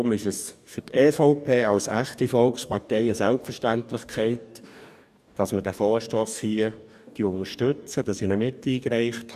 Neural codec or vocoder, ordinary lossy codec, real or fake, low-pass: codec, 44.1 kHz, 2.6 kbps, DAC; none; fake; 14.4 kHz